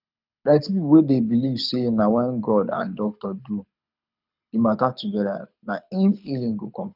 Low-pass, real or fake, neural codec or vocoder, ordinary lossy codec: 5.4 kHz; fake; codec, 24 kHz, 6 kbps, HILCodec; none